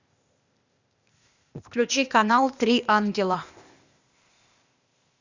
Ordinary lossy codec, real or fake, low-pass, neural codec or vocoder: Opus, 64 kbps; fake; 7.2 kHz; codec, 16 kHz, 0.8 kbps, ZipCodec